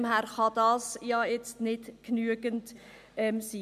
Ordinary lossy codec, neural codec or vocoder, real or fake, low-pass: none; none; real; 14.4 kHz